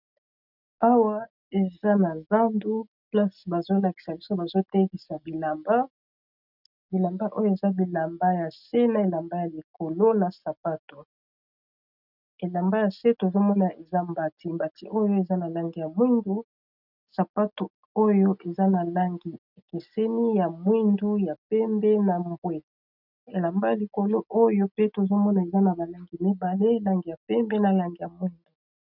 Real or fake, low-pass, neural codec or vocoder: real; 5.4 kHz; none